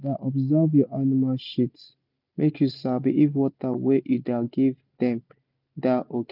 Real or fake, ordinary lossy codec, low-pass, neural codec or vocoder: real; none; 5.4 kHz; none